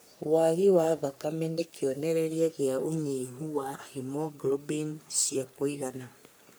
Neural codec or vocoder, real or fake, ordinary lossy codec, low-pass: codec, 44.1 kHz, 3.4 kbps, Pupu-Codec; fake; none; none